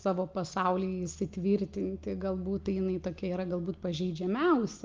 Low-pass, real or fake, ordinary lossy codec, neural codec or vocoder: 7.2 kHz; real; Opus, 24 kbps; none